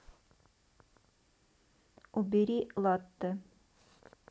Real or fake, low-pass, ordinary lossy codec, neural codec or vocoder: real; none; none; none